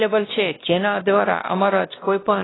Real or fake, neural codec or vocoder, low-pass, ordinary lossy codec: fake; codec, 16 kHz, 1 kbps, X-Codec, WavLM features, trained on Multilingual LibriSpeech; 7.2 kHz; AAC, 16 kbps